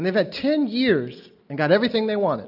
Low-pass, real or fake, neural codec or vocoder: 5.4 kHz; real; none